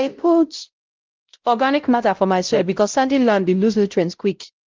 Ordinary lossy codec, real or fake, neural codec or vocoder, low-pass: Opus, 32 kbps; fake; codec, 16 kHz, 0.5 kbps, X-Codec, WavLM features, trained on Multilingual LibriSpeech; 7.2 kHz